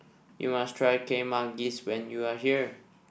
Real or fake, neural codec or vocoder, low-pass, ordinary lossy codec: real; none; none; none